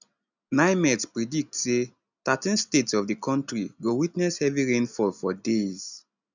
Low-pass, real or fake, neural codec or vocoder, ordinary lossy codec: 7.2 kHz; real; none; none